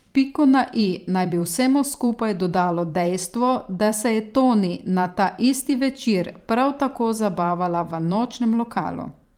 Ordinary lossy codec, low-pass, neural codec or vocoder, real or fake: Opus, 32 kbps; 19.8 kHz; vocoder, 44.1 kHz, 128 mel bands every 512 samples, BigVGAN v2; fake